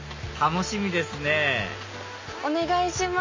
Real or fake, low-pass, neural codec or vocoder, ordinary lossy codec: real; 7.2 kHz; none; MP3, 32 kbps